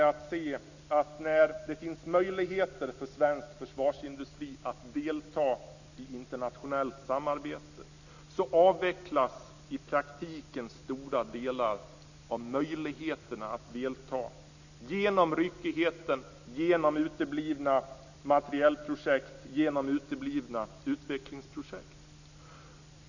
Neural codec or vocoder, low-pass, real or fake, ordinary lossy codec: none; 7.2 kHz; real; none